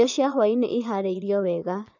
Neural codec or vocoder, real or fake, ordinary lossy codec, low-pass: vocoder, 22.05 kHz, 80 mel bands, Vocos; fake; none; 7.2 kHz